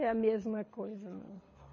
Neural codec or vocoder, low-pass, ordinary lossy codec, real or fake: codec, 24 kHz, 6 kbps, HILCodec; 7.2 kHz; MP3, 32 kbps; fake